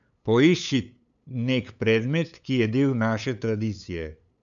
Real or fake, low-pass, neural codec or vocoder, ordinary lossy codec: fake; 7.2 kHz; codec, 16 kHz, 8 kbps, FunCodec, trained on LibriTTS, 25 frames a second; none